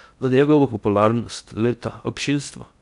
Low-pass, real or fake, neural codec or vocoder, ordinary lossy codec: 10.8 kHz; fake; codec, 16 kHz in and 24 kHz out, 0.8 kbps, FocalCodec, streaming, 65536 codes; none